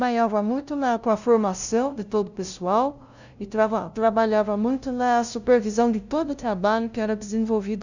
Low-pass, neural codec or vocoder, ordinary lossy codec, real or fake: 7.2 kHz; codec, 16 kHz, 0.5 kbps, FunCodec, trained on LibriTTS, 25 frames a second; none; fake